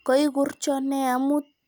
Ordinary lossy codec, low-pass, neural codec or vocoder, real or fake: none; none; none; real